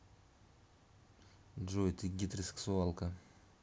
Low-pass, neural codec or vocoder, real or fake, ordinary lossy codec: none; none; real; none